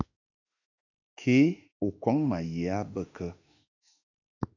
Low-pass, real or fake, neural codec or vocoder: 7.2 kHz; fake; autoencoder, 48 kHz, 32 numbers a frame, DAC-VAE, trained on Japanese speech